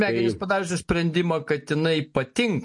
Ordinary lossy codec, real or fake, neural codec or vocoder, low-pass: MP3, 48 kbps; real; none; 10.8 kHz